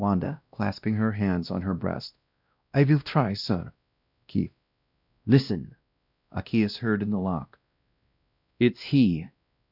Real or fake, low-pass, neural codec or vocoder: fake; 5.4 kHz; codec, 16 kHz, 1 kbps, X-Codec, WavLM features, trained on Multilingual LibriSpeech